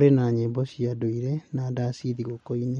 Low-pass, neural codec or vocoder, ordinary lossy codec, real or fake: 19.8 kHz; none; MP3, 48 kbps; real